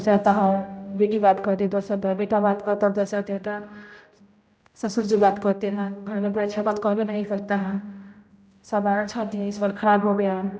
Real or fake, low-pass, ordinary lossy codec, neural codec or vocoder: fake; none; none; codec, 16 kHz, 0.5 kbps, X-Codec, HuBERT features, trained on general audio